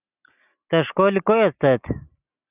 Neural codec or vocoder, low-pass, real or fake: none; 3.6 kHz; real